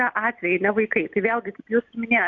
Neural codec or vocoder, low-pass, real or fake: none; 7.2 kHz; real